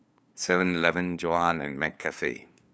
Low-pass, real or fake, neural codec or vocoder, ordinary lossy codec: none; fake; codec, 16 kHz, 2 kbps, FunCodec, trained on LibriTTS, 25 frames a second; none